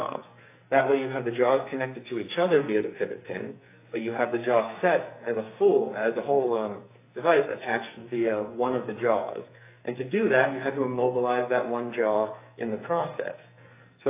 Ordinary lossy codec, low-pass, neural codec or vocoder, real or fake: AAC, 24 kbps; 3.6 kHz; codec, 44.1 kHz, 2.6 kbps, SNAC; fake